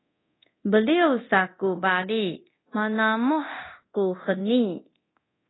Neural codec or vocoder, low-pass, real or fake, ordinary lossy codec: codec, 24 kHz, 0.9 kbps, DualCodec; 7.2 kHz; fake; AAC, 16 kbps